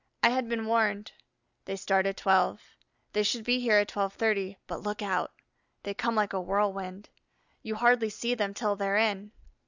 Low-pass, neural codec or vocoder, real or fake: 7.2 kHz; none; real